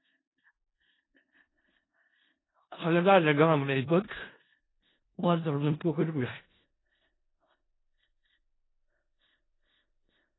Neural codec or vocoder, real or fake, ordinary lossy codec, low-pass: codec, 16 kHz in and 24 kHz out, 0.4 kbps, LongCat-Audio-Codec, four codebook decoder; fake; AAC, 16 kbps; 7.2 kHz